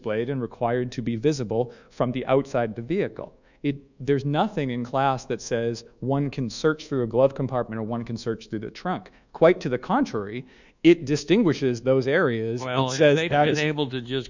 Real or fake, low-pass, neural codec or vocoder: fake; 7.2 kHz; codec, 24 kHz, 1.2 kbps, DualCodec